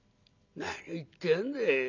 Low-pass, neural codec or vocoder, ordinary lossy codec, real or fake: 7.2 kHz; none; none; real